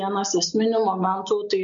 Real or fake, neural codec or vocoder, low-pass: real; none; 7.2 kHz